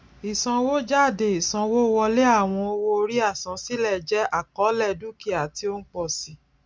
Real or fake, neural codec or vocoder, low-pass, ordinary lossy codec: real; none; none; none